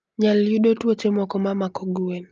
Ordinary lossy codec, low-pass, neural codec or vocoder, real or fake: Opus, 24 kbps; 7.2 kHz; none; real